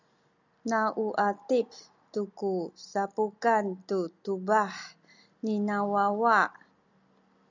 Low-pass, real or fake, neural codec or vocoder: 7.2 kHz; real; none